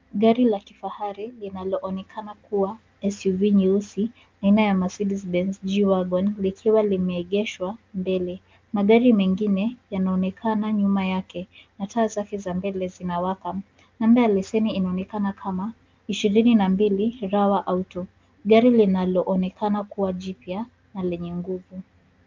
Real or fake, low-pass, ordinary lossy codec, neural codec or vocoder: real; 7.2 kHz; Opus, 24 kbps; none